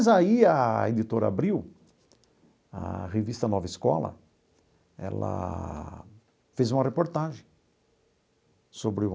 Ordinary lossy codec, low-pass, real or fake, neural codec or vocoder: none; none; real; none